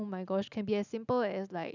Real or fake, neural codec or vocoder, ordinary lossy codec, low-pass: real; none; none; 7.2 kHz